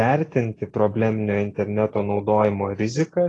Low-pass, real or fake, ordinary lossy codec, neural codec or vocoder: 10.8 kHz; real; AAC, 32 kbps; none